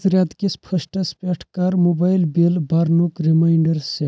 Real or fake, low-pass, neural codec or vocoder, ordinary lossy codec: real; none; none; none